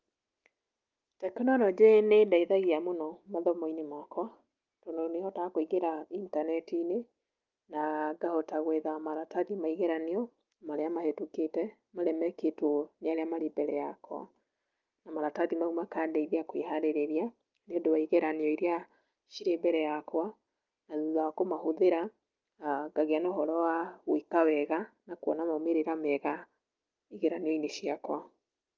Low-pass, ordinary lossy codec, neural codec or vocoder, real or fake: 7.2 kHz; Opus, 24 kbps; none; real